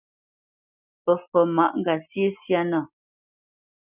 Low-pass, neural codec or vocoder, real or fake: 3.6 kHz; none; real